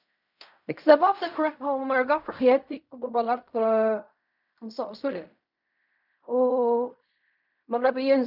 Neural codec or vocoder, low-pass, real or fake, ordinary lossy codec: codec, 16 kHz in and 24 kHz out, 0.4 kbps, LongCat-Audio-Codec, fine tuned four codebook decoder; 5.4 kHz; fake; none